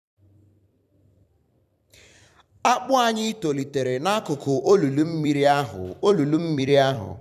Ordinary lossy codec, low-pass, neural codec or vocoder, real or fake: none; 14.4 kHz; vocoder, 44.1 kHz, 128 mel bands every 256 samples, BigVGAN v2; fake